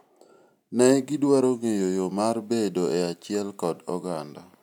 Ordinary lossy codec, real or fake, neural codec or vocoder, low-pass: none; real; none; 19.8 kHz